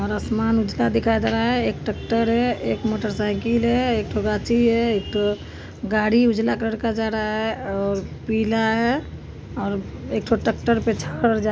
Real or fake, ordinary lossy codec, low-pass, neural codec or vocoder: real; none; none; none